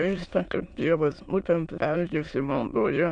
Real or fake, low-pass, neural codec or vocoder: fake; 9.9 kHz; autoencoder, 22.05 kHz, a latent of 192 numbers a frame, VITS, trained on many speakers